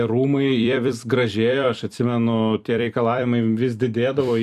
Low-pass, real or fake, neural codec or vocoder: 14.4 kHz; fake; vocoder, 44.1 kHz, 128 mel bands every 256 samples, BigVGAN v2